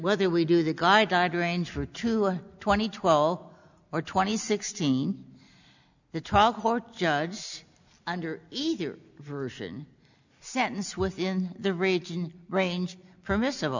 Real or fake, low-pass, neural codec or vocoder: real; 7.2 kHz; none